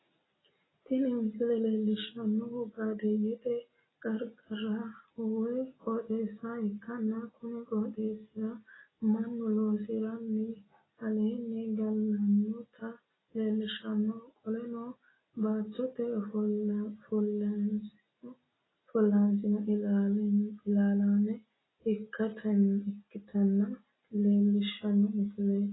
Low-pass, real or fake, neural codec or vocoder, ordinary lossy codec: 7.2 kHz; real; none; AAC, 16 kbps